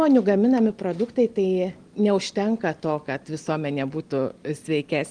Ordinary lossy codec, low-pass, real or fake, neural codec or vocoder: Opus, 24 kbps; 9.9 kHz; real; none